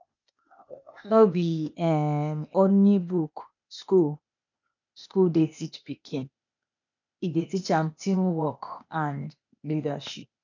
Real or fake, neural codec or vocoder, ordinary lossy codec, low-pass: fake; codec, 16 kHz, 0.8 kbps, ZipCodec; none; 7.2 kHz